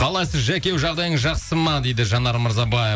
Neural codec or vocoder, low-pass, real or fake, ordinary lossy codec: none; none; real; none